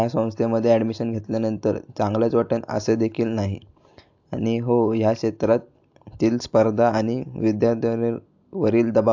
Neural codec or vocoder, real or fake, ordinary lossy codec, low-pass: none; real; AAC, 48 kbps; 7.2 kHz